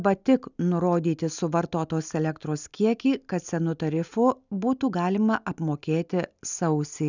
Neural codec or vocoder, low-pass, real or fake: none; 7.2 kHz; real